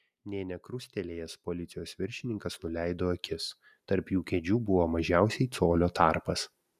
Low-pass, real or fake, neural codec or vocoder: 14.4 kHz; real; none